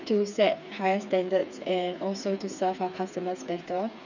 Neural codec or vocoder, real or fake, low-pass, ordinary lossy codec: codec, 16 kHz, 4 kbps, FreqCodec, smaller model; fake; 7.2 kHz; none